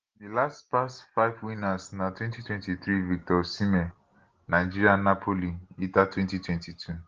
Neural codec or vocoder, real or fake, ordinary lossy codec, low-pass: none; real; Opus, 16 kbps; 7.2 kHz